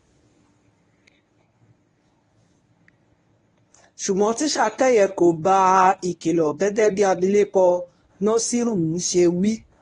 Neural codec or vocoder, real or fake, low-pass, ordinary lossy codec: codec, 24 kHz, 0.9 kbps, WavTokenizer, medium speech release version 1; fake; 10.8 kHz; AAC, 32 kbps